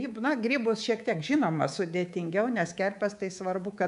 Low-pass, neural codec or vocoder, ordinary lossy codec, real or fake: 10.8 kHz; codec, 24 kHz, 3.1 kbps, DualCodec; AAC, 96 kbps; fake